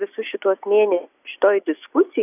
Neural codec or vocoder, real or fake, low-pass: none; real; 3.6 kHz